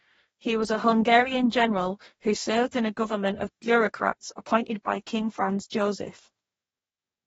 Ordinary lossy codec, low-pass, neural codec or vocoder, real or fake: AAC, 24 kbps; 19.8 kHz; codec, 44.1 kHz, 2.6 kbps, DAC; fake